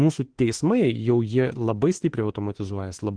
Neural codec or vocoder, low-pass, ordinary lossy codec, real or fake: autoencoder, 48 kHz, 32 numbers a frame, DAC-VAE, trained on Japanese speech; 9.9 kHz; Opus, 16 kbps; fake